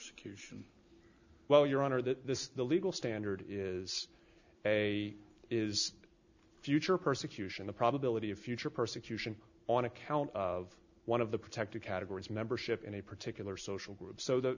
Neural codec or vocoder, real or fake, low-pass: none; real; 7.2 kHz